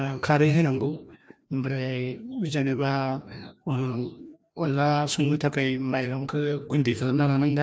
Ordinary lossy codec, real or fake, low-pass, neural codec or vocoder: none; fake; none; codec, 16 kHz, 1 kbps, FreqCodec, larger model